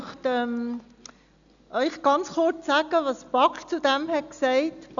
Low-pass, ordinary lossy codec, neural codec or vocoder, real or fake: 7.2 kHz; none; none; real